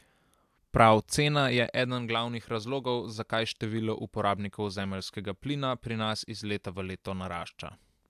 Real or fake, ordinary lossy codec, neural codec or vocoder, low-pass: real; AAC, 96 kbps; none; 14.4 kHz